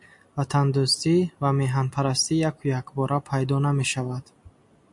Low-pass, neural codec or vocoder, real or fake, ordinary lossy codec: 10.8 kHz; none; real; MP3, 64 kbps